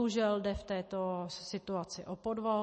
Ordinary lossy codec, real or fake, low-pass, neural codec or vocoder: MP3, 32 kbps; real; 10.8 kHz; none